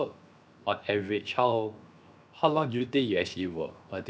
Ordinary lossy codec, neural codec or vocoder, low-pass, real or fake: none; codec, 16 kHz, 0.7 kbps, FocalCodec; none; fake